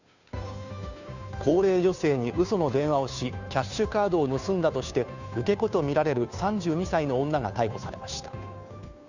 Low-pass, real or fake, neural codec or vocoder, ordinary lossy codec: 7.2 kHz; fake; codec, 16 kHz, 2 kbps, FunCodec, trained on Chinese and English, 25 frames a second; none